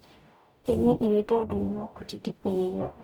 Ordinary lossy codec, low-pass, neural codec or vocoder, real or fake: none; none; codec, 44.1 kHz, 0.9 kbps, DAC; fake